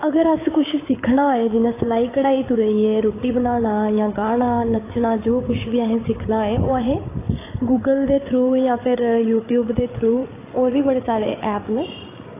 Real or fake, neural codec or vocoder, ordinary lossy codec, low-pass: fake; codec, 16 kHz, 8 kbps, FreqCodec, larger model; AAC, 16 kbps; 3.6 kHz